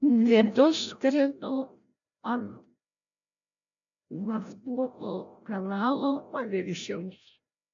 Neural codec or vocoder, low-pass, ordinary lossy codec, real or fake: codec, 16 kHz, 0.5 kbps, FreqCodec, larger model; 7.2 kHz; MP3, 64 kbps; fake